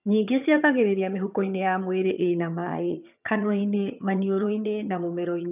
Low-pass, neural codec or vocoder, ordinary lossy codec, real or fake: 3.6 kHz; vocoder, 22.05 kHz, 80 mel bands, HiFi-GAN; none; fake